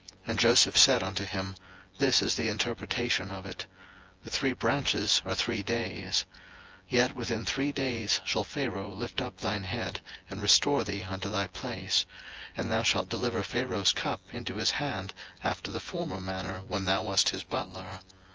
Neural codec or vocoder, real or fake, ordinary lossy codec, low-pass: vocoder, 24 kHz, 100 mel bands, Vocos; fake; Opus, 24 kbps; 7.2 kHz